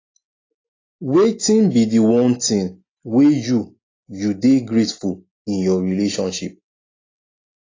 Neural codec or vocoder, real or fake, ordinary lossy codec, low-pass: none; real; AAC, 32 kbps; 7.2 kHz